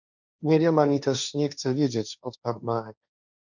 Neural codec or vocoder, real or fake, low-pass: codec, 16 kHz, 1.1 kbps, Voila-Tokenizer; fake; 7.2 kHz